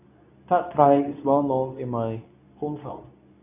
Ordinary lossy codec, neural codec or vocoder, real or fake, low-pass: none; codec, 24 kHz, 0.9 kbps, WavTokenizer, medium speech release version 2; fake; 3.6 kHz